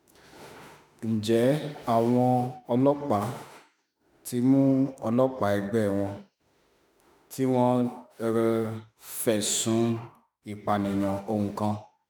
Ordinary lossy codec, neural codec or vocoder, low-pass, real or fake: none; autoencoder, 48 kHz, 32 numbers a frame, DAC-VAE, trained on Japanese speech; none; fake